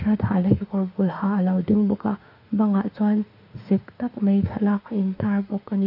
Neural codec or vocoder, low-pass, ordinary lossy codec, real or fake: autoencoder, 48 kHz, 32 numbers a frame, DAC-VAE, trained on Japanese speech; 5.4 kHz; none; fake